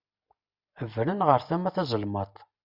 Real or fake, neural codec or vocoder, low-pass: real; none; 5.4 kHz